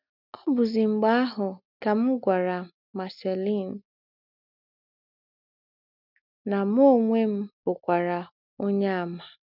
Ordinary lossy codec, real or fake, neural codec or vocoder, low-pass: none; real; none; 5.4 kHz